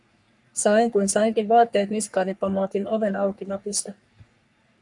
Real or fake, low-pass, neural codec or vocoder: fake; 10.8 kHz; codec, 44.1 kHz, 3.4 kbps, Pupu-Codec